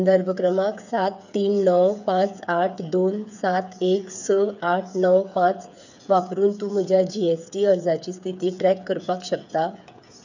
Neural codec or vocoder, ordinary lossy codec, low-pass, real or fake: codec, 16 kHz, 8 kbps, FreqCodec, smaller model; none; 7.2 kHz; fake